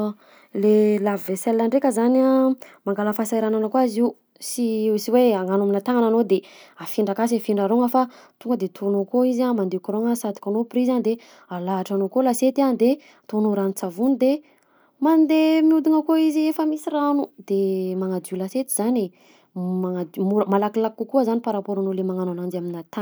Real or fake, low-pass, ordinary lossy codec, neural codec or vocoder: real; none; none; none